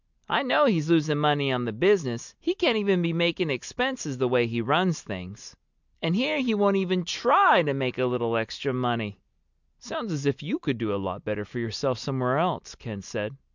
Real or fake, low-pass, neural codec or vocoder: real; 7.2 kHz; none